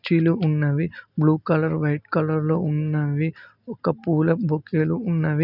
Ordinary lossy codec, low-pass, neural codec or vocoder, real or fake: none; 5.4 kHz; none; real